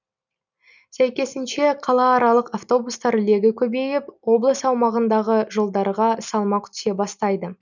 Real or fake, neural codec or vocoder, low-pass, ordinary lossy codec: real; none; 7.2 kHz; none